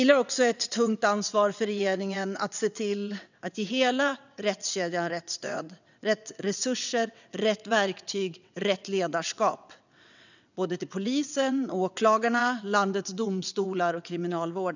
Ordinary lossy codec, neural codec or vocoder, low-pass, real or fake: none; vocoder, 22.05 kHz, 80 mel bands, WaveNeXt; 7.2 kHz; fake